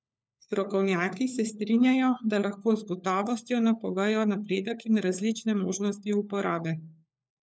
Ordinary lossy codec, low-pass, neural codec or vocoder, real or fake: none; none; codec, 16 kHz, 4 kbps, FunCodec, trained on LibriTTS, 50 frames a second; fake